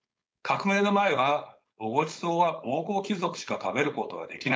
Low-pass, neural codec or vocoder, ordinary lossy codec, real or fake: none; codec, 16 kHz, 4.8 kbps, FACodec; none; fake